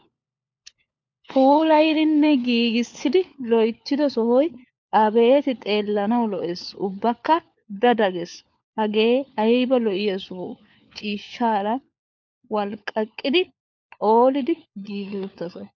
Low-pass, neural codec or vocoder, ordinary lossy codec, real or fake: 7.2 kHz; codec, 16 kHz, 4 kbps, FunCodec, trained on LibriTTS, 50 frames a second; MP3, 64 kbps; fake